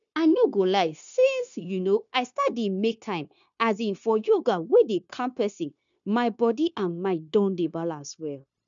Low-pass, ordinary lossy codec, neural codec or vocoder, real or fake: 7.2 kHz; none; codec, 16 kHz, 0.9 kbps, LongCat-Audio-Codec; fake